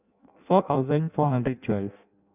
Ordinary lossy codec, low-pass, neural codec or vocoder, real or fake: none; 3.6 kHz; codec, 16 kHz in and 24 kHz out, 0.6 kbps, FireRedTTS-2 codec; fake